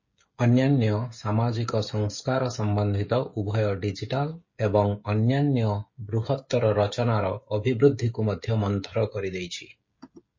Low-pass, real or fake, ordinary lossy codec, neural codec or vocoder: 7.2 kHz; fake; MP3, 32 kbps; codec, 16 kHz, 16 kbps, FreqCodec, smaller model